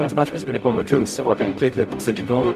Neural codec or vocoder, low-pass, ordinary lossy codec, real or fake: codec, 44.1 kHz, 0.9 kbps, DAC; 14.4 kHz; MP3, 96 kbps; fake